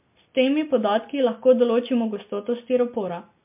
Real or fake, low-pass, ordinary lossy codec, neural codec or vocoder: real; 3.6 kHz; MP3, 32 kbps; none